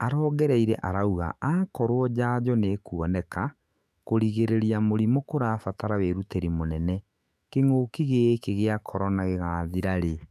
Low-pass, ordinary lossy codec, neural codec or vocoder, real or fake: 14.4 kHz; none; autoencoder, 48 kHz, 128 numbers a frame, DAC-VAE, trained on Japanese speech; fake